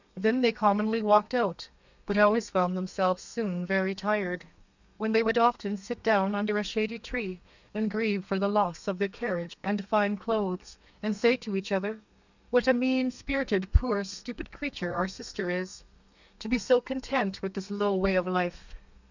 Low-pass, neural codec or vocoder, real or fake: 7.2 kHz; codec, 32 kHz, 1.9 kbps, SNAC; fake